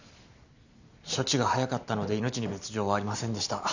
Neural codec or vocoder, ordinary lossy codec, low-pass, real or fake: none; none; 7.2 kHz; real